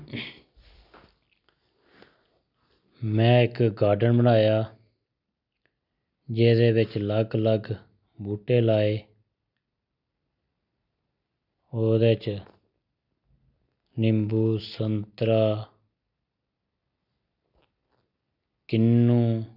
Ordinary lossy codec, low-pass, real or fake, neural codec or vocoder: none; 5.4 kHz; real; none